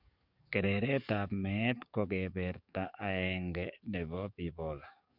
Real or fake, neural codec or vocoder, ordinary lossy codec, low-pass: fake; codec, 44.1 kHz, 7.8 kbps, DAC; none; 5.4 kHz